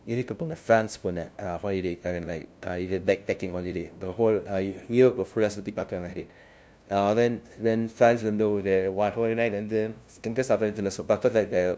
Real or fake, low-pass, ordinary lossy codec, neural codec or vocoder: fake; none; none; codec, 16 kHz, 0.5 kbps, FunCodec, trained on LibriTTS, 25 frames a second